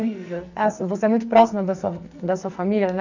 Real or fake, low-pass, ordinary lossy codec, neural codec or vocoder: fake; 7.2 kHz; none; codec, 44.1 kHz, 2.6 kbps, SNAC